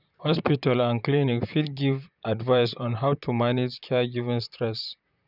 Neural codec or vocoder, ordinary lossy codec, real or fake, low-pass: vocoder, 44.1 kHz, 128 mel bands, Pupu-Vocoder; none; fake; 5.4 kHz